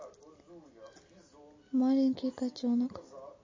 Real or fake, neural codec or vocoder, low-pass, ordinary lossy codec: real; none; 7.2 kHz; MP3, 32 kbps